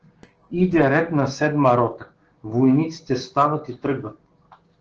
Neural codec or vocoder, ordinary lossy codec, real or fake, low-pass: codec, 16 kHz, 6 kbps, DAC; Opus, 16 kbps; fake; 7.2 kHz